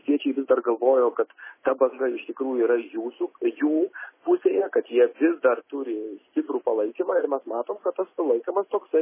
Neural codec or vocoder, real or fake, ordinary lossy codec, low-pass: none; real; MP3, 16 kbps; 3.6 kHz